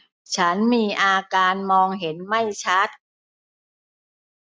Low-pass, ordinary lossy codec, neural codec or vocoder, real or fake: none; none; none; real